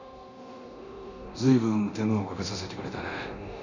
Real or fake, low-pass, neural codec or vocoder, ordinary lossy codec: fake; 7.2 kHz; codec, 24 kHz, 0.9 kbps, DualCodec; none